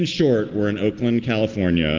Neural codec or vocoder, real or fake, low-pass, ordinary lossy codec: none; real; 7.2 kHz; Opus, 16 kbps